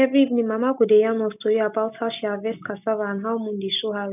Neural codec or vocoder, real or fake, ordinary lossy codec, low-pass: none; real; none; 3.6 kHz